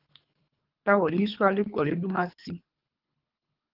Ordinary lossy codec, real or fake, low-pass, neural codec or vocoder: Opus, 32 kbps; fake; 5.4 kHz; codec, 24 kHz, 3 kbps, HILCodec